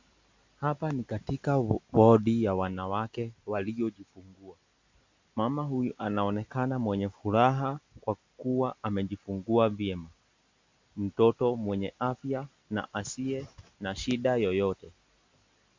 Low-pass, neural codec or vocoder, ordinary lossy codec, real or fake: 7.2 kHz; none; MP3, 64 kbps; real